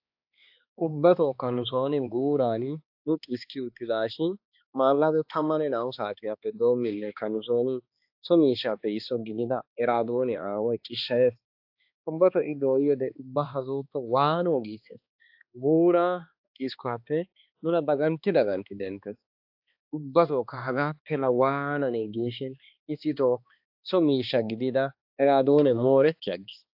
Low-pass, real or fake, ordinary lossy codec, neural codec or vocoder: 5.4 kHz; fake; AAC, 48 kbps; codec, 16 kHz, 2 kbps, X-Codec, HuBERT features, trained on balanced general audio